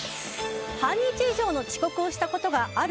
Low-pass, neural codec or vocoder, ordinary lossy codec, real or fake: none; none; none; real